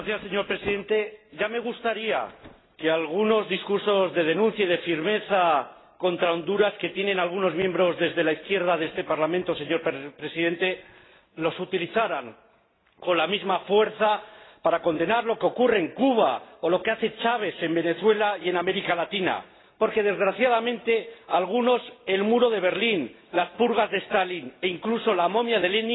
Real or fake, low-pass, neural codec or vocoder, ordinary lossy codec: real; 7.2 kHz; none; AAC, 16 kbps